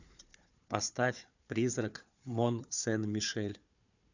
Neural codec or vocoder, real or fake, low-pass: codec, 16 kHz, 4 kbps, FunCodec, trained on Chinese and English, 50 frames a second; fake; 7.2 kHz